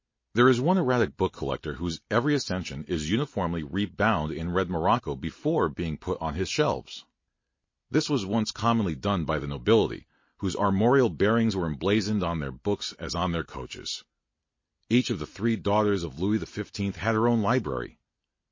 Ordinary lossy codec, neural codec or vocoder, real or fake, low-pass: MP3, 32 kbps; none; real; 7.2 kHz